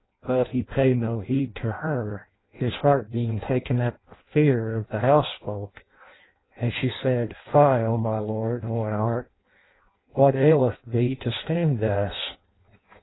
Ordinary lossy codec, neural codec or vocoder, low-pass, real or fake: AAC, 16 kbps; codec, 16 kHz in and 24 kHz out, 0.6 kbps, FireRedTTS-2 codec; 7.2 kHz; fake